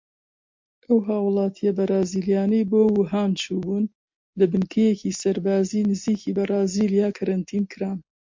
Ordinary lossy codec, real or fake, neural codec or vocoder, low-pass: MP3, 48 kbps; real; none; 7.2 kHz